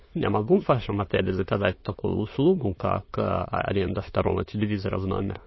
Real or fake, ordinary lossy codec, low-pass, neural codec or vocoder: fake; MP3, 24 kbps; 7.2 kHz; autoencoder, 22.05 kHz, a latent of 192 numbers a frame, VITS, trained on many speakers